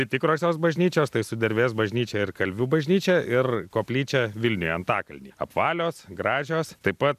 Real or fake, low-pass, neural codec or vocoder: real; 14.4 kHz; none